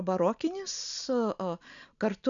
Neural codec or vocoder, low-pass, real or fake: none; 7.2 kHz; real